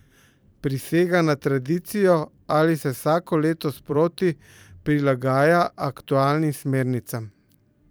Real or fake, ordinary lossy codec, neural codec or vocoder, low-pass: fake; none; vocoder, 44.1 kHz, 128 mel bands every 512 samples, BigVGAN v2; none